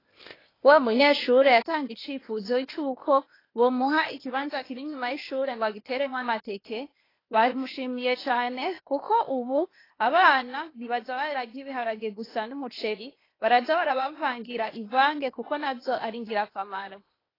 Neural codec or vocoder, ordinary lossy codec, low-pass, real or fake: codec, 16 kHz, 0.8 kbps, ZipCodec; AAC, 24 kbps; 5.4 kHz; fake